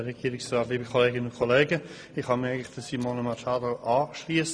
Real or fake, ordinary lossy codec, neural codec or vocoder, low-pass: real; none; none; none